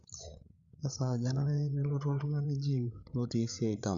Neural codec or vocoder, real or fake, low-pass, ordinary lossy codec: codec, 16 kHz, 8 kbps, FreqCodec, larger model; fake; 7.2 kHz; Opus, 64 kbps